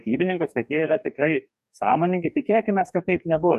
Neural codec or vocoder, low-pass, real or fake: codec, 44.1 kHz, 2.6 kbps, DAC; 14.4 kHz; fake